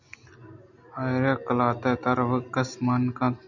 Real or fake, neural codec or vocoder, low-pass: real; none; 7.2 kHz